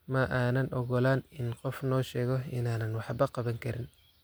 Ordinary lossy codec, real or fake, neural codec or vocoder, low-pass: none; real; none; none